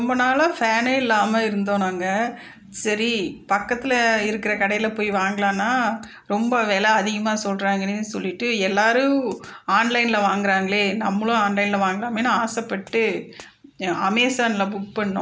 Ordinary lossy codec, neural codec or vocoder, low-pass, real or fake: none; none; none; real